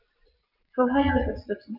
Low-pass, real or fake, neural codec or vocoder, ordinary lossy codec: 5.4 kHz; fake; vocoder, 22.05 kHz, 80 mel bands, WaveNeXt; none